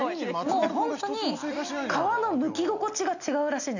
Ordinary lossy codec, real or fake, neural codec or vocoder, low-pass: none; real; none; 7.2 kHz